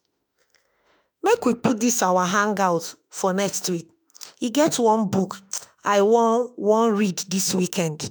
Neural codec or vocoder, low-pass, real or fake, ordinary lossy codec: autoencoder, 48 kHz, 32 numbers a frame, DAC-VAE, trained on Japanese speech; none; fake; none